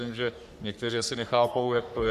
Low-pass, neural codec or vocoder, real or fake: 14.4 kHz; codec, 44.1 kHz, 3.4 kbps, Pupu-Codec; fake